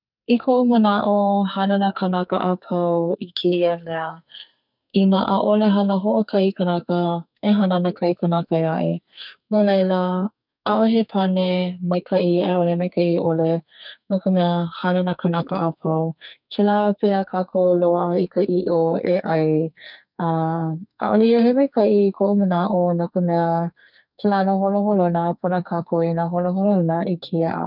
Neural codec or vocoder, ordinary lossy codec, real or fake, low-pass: codec, 44.1 kHz, 2.6 kbps, SNAC; none; fake; 5.4 kHz